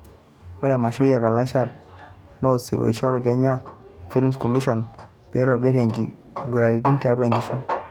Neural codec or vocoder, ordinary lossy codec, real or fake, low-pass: codec, 44.1 kHz, 2.6 kbps, DAC; none; fake; 19.8 kHz